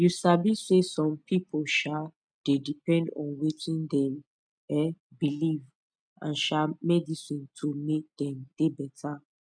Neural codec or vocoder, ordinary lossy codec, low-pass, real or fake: none; none; 9.9 kHz; real